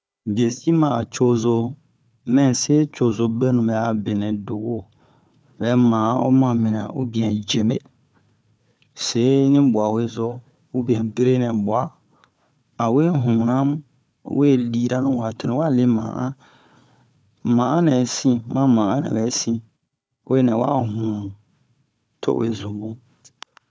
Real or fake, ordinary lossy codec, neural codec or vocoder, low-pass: fake; none; codec, 16 kHz, 4 kbps, FunCodec, trained on Chinese and English, 50 frames a second; none